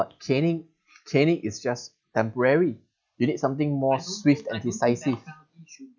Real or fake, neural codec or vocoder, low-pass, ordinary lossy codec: real; none; 7.2 kHz; none